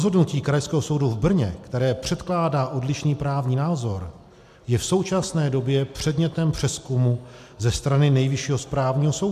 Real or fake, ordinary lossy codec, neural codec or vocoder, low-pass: real; MP3, 96 kbps; none; 14.4 kHz